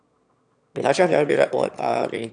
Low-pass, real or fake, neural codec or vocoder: 9.9 kHz; fake; autoencoder, 22.05 kHz, a latent of 192 numbers a frame, VITS, trained on one speaker